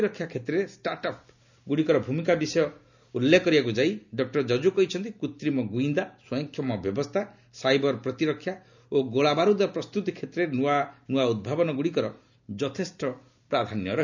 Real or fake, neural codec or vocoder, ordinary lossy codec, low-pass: real; none; none; 7.2 kHz